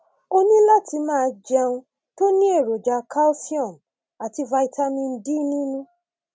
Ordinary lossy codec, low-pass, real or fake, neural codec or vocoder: none; none; real; none